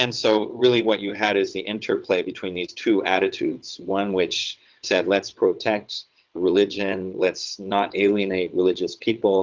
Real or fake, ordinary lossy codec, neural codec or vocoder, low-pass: fake; Opus, 24 kbps; vocoder, 22.05 kHz, 80 mel bands, WaveNeXt; 7.2 kHz